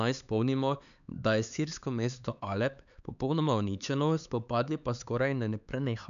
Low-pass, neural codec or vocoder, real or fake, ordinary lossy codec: 7.2 kHz; codec, 16 kHz, 4 kbps, X-Codec, HuBERT features, trained on LibriSpeech; fake; none